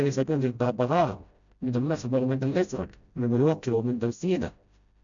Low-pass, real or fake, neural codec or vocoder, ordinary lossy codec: 7.2 kHz; fake; codec, 16 kHz, 0.5 kbps, FreqCodec, smaller model; none